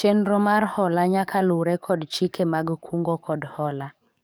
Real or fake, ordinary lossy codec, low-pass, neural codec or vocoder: fake; none; none; codec, 44.1 kHz, 7.8 kbps, DAC